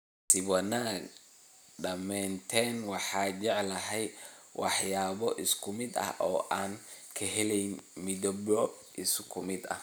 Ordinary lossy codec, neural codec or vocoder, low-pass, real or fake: none; none; none; real